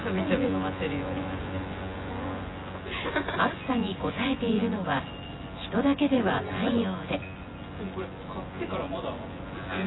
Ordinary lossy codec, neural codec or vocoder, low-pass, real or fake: AAC, 16 kbps; vocoder, 24 kHz, 100 mel bands, Vocos; 7.2 kHz; fake